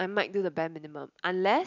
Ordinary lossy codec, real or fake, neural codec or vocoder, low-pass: none; real; none; 7.2 kHz